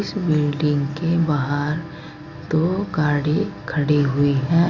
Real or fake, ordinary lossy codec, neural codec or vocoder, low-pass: real; Opus, 64 kbps; none; 7.2 kHz